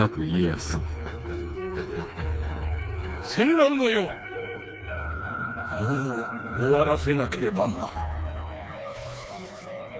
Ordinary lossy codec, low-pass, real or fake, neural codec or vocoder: none; none; fake; codec, 16 kHz, 2 kbps, FreqCodec, smaller model